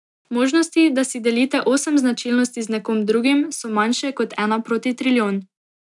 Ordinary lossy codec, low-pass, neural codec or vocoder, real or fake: none; 10.8 kHz; none; real